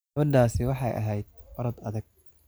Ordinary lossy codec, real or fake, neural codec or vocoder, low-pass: none; real; none; none